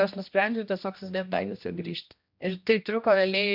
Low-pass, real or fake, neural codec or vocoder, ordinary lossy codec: 5.4 kHz; fake; codec, 16 kHz, 1 kbps, X-Codec, HuBERT features, trained on general audio; MP3, 48 kbps